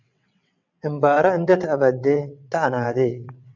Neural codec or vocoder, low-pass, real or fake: vocoder, 22.05 kHz, 80 mel bands, WaveNeXt; 7.2 kHz; fake